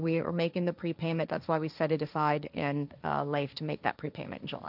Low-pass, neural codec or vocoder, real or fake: 5.4 kHz; codec, 16 kHz, 1.1 kbps, Voila-Tokenizer; fake